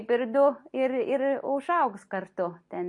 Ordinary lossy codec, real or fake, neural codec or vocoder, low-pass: MP3, 48 kbps; real; none; 10.8 kHz